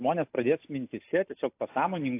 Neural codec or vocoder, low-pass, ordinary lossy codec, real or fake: none; 3.6 kHz; AAC, 24 kbps; real